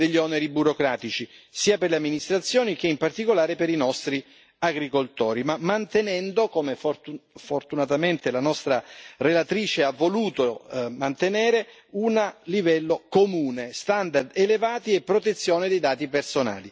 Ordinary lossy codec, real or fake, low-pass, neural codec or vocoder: none; real; none; none